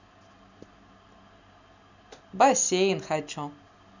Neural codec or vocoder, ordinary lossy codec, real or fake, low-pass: none; none; real; 7.2 kHz